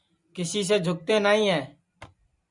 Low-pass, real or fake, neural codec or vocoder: 10.8 kHz; fake; vocoder, 44.1 kHz, 128 mel bands every 256 samples, BigVGAN v2